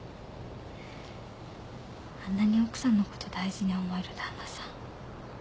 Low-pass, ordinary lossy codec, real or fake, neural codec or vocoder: none; none; real; none